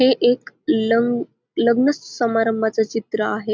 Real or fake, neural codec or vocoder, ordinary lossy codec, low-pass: real; none; none; none